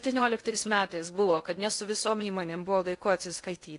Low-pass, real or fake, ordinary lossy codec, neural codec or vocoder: 10.8 kHz; fake; AAC, 48 kbps; codec, 16 kHz in and 24 kHz out, 0.6 kbps, FocalCodec, streaming, 2048 codes